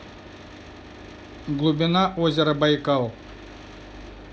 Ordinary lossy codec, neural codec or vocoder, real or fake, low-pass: none; none; real; none